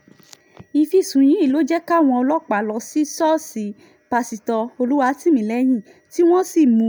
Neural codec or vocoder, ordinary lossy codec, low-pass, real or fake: none; none; none; real